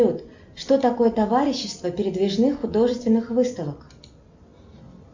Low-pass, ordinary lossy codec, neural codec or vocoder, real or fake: 7.2 kHz; MP3, 64 kbps; none; real